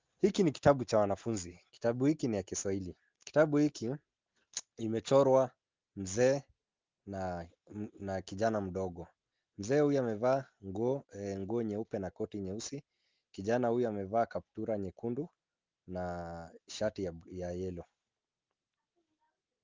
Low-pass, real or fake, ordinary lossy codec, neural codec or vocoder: 7.2 kHz; real; Opus, 32 kbps; none